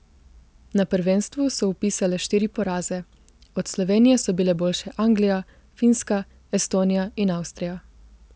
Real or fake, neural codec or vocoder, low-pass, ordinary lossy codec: real; none; none; none